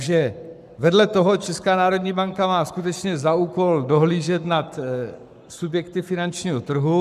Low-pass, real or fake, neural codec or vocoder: 14.4 kHz; fake; codec, 44.1 kHz, 7.8 kbps, Pupu-Codec